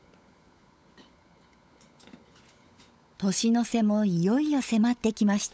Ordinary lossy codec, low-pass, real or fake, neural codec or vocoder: none; none; fake; codec, 16 kHz, 16 kbps, FunCodec, trained on LibriTTS, 50 frames a second